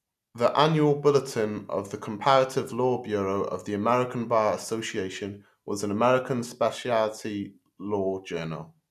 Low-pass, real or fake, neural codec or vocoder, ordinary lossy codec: 14.4 kHz; real; none; none